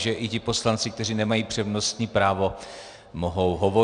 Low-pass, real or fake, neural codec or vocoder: 9.9 kHz; real; none